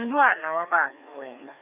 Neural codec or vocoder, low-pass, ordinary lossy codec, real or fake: codec, 16 kHz, 2 kbps, FreqCodec, larger model; 3.6 kHz; none; fake